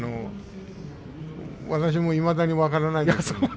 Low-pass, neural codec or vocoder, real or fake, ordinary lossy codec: none; none; real; none